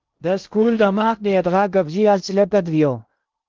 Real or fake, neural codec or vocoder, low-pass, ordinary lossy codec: fake; codec, 16 kHz in and 24 kHz out, 0.6 kbps, FocalCodec, streaming, 2048 codes; 7.2 kHz; Opus, 32 kbps